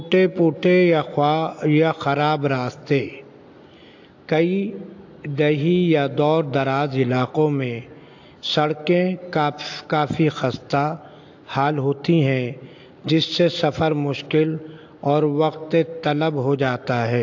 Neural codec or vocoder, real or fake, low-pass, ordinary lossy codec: none; real; 7.2 kHz; AAC, 48 kbps